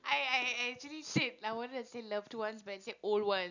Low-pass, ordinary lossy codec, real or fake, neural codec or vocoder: 7.2 kHz; none; real; none